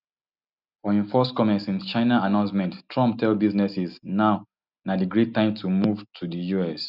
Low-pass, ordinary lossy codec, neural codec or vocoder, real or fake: 5.4 kHz; none; none; real